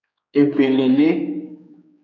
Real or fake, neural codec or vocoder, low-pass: fake; codec, 16 kHz, 4 kbps, X-Codec, HuBERT features, trained on general audio; 7.2 kHz